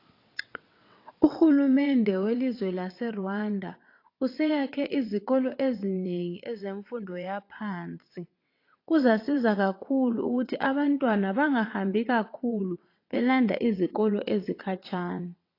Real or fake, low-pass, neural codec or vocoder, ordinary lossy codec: fake; 5.4 kHz; vocoder, 22.05 kHz, 80 mel bands, WaveNeXt; AAC, 32 kbps